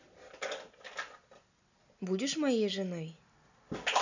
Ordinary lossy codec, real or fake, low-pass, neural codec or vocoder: none; real; 7.2 kHz; none